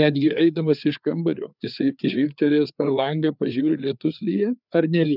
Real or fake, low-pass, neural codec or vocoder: fake; 5.4 kHz; codec, 16 kHz, 4 kbps, FreqCodec, larger model